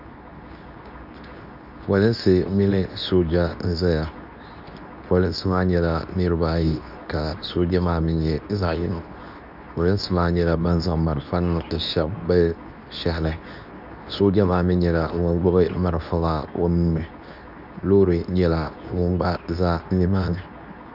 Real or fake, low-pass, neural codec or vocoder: fake; 5.4 kHz; codec, 24 kHz, 0.9 kbps, WavTokenizer, medium speech release version 2